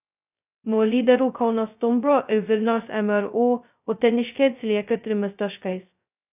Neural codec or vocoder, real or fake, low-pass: codec, 16 kHz, 0.2 kbps, FocalCodec; fake; 3.6 kHz